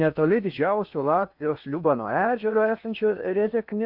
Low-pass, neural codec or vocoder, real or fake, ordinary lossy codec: 5.4 kHz; codec, 16 kHz in and 24 kHz out, 0.8 kbps, FocalCodec, streaming, 65536 codes; fake; Opus, 64 kbps